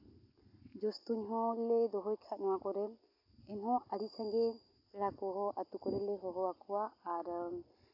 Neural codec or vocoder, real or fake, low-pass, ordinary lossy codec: none; real; 5.4 kHz; AAC, 32 kbps